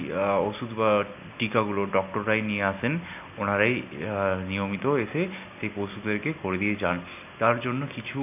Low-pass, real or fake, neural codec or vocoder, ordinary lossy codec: 3.6 kHz; real; none; none